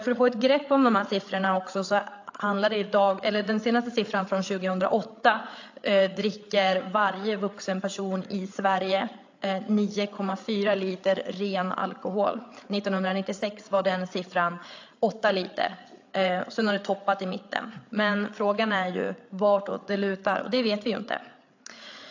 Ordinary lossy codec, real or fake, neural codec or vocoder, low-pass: AAC, 48 kbps; fake; codec, 16 kHz, 8 kbps, FreqCodec, larger model; 7.2 kHz